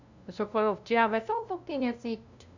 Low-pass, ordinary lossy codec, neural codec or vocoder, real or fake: 7.2 kHz; none; codec, 16 kHz, 0.5 kbps, FunCodec, trained on LibriTTS, 25 frames a second; fake